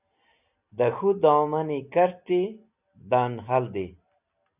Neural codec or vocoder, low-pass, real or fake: none; 3.6 kHz; real